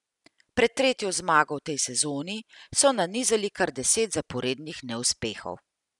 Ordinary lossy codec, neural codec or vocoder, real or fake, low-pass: none; none; real; 10.8 kHz